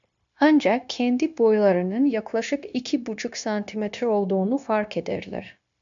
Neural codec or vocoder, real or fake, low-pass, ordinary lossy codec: codec, 16 kHz, 0.9 kbps, LongCat-Audio-Codec; fake; 7.2 kHz; AAC, 64 kbps